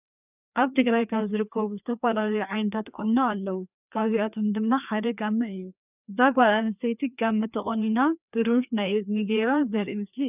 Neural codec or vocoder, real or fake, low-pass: codec, 16 kHz, 2 kbps, FreqCodec, larger model; fake; 3.6 kHz